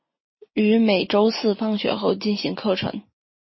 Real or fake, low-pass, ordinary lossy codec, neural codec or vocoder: real; 7.2 kHz; MP3, 24 kbps; none